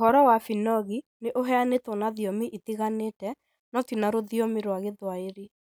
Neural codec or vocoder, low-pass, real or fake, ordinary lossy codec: none; none; real; none